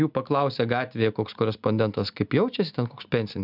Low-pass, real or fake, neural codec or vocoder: 5.4 kHz; real; none